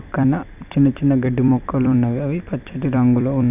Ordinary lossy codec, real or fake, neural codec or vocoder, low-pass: none; fake; vocoder, 44.1 kHz, 128 mel bands every 256 samples, BigVGAN v2; 3.6 kHz